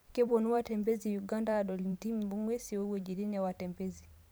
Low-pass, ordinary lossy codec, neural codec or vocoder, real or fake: none; none; vocoder, 44.1 kHz, 128 mel bands every 512 samples, BigVGAN v2; fake